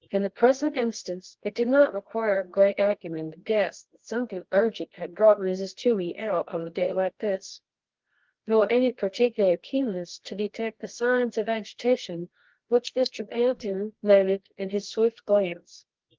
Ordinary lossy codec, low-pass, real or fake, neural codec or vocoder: Opus, 16 kbps; 7.2 kHz; fake; codec, 24 kHz, 0.9 kbps, WavTokenizer, medium music audio release